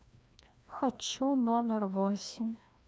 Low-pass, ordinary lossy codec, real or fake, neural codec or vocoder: none; none; fake; codec, 16 kHz, 1 kbps, FreqCodec, larger model